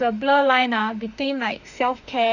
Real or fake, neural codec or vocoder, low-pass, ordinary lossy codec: fake; autoencoder, 48 kHz, 32 numbers a frame, DAC-VAE, trained on Japanese speech; 7.2 kHz; none